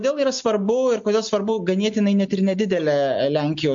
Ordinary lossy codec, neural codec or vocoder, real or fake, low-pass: MP3, 48 kbps; none; real; 7.2 kHz